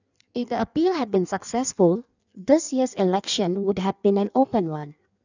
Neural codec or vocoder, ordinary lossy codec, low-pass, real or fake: codec, 16 kHz in and 24 kHz out, 1.1 kbps, FireRedTTS-2 codec; none; 7.2 kHz; fake